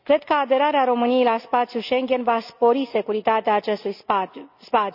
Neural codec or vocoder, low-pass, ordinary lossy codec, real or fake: none; 5.4 kHz; none; real